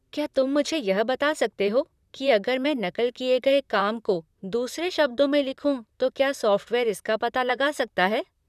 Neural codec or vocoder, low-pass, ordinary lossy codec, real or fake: vocoder, 44.1 kHz, 128 mel bands, Pupu-Vocoder; 14.4 kHz; none; fake